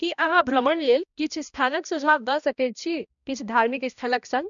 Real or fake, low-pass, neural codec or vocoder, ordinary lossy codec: fake; 7.2 kHz; codec, 16 kHz, 1 kbps, X-Codec, HuBERT features, trained on balanced general audio; none